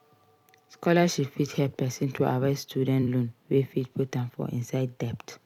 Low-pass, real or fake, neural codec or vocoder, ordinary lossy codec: 19.8 kHz; fake; vocoder, 44.1 kHz, 128 mel bands every 256 samples, BigVGAN v2; none